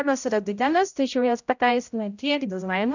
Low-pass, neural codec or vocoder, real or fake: 7.2 kHz; codec, 16 kHz, 0.5 kbps, X-Codec, HuBERT features, trained on general audio; fake